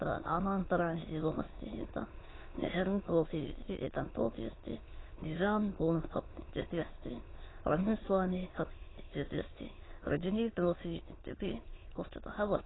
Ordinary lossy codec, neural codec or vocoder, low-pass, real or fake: AAC, 16 kbps; autoencoder, 22.05 kHz, a latent of 192 numbers a frame, VITS, trained on many speakers; 7.2 kHz; fake